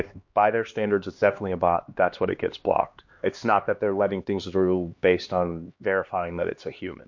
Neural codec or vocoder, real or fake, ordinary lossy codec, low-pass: codec, 16 kHz, 2 kbps, X-Codec, HuBERT features, trained on LibriSpeech; fake; MP3, 48 kbps; 7.2 kHz